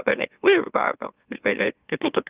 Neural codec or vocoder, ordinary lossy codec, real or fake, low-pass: autoencoder, 44.1 kHz, a latent of 192 numbers a frame, MeloTTS; Opus, 32 kbps; fake; 3.6 kHz